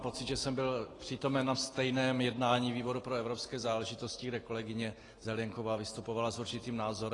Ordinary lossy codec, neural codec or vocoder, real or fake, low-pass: AAC, 32 kbps; none; real; 10.8 kHz